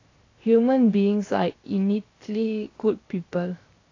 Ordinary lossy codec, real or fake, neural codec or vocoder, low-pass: AAC, 32 kbps; fake; codec, 16 kHz, 0.7 kbps, FocalCodec; 7.2 kHz